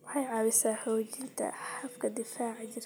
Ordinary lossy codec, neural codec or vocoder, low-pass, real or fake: none; none; none; real